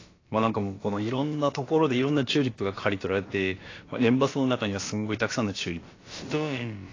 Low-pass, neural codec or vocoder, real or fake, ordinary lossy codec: 7.2 kHz; codec, 16 kHz, about 1 kbps, DyCAST, with the encoder's durations; fake; AAC, 32 kbps